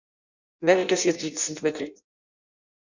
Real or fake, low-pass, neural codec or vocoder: fake; 7.2 kHz; codec, 16 kHz in and 24 kHz out, 0.6 kbps, FireRedTTS-2 codec